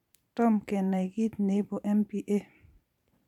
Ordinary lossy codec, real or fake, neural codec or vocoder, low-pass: MP3, 96 kbps; fake; vocoder, 44.1 kHz, 128 mel bands every 512 samples, BigVGAN v2; 19.8 kHz